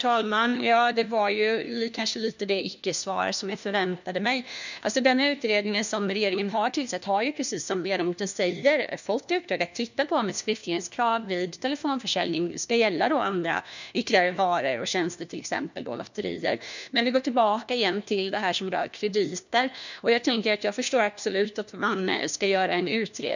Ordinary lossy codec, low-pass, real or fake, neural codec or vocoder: none; 7.2 kHz; fake; codec, 16 kHz, 1 kbps, FunCodec, trained on LibriTTS, 50 frames a second